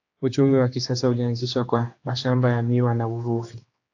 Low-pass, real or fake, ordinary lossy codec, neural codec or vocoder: 7.2 kHz; fake; AAC, 48 kbps; codec, 16 kHz, 2 kbps, X-Codec, HuBERT features, trained on general audio